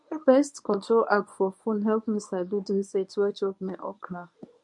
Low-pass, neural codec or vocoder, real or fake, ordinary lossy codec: 10.8 kHz; codec, 24 kHz, 0.9 kbps, WavTokenizer, medium speech release version 1; fake; MP3, 64 kbps